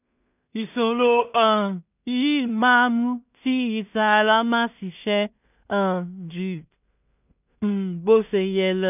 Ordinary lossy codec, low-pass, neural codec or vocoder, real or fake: none; 3.6 kHz; codec, 16 kHz in and 24 kHz out, 0.4 kbps, LongCat-Audio-Codec, two codebook decoder; fake